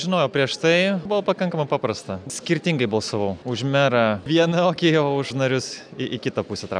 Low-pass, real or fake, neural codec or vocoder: 9.9 kHz; real; none